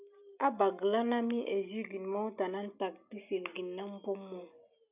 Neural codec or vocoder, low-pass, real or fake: none; 3.6 kHz; real